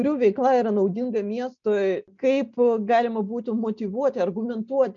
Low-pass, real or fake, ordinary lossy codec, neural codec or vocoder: 7.2 kHz; real; MP3, 96 kbps; none